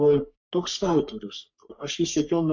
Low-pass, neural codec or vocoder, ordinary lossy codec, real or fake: 7.2 kHz; codec, 44.1 kHz, 3.4 kbps, Pupu-Codec; MP3, 64 kbps; fake